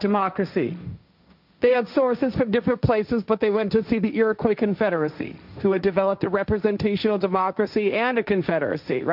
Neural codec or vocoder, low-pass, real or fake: codec, 16 kHz, 1.1 kbps, Voila-Tokenizer; 5.4 kHz; fake